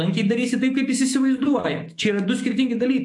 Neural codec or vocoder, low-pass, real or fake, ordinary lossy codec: autoencoder, 48 kHz, 128 numbers a frame, DAC-VAE, trained on Japanese speech; 10.8 kHz; fake; AAC, 64 kbps